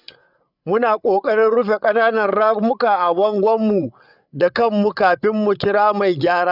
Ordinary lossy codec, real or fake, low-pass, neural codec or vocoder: none; fake; 5.4 kHz; codec, 16 kHz, 8 kbps, FreqCodec, larger model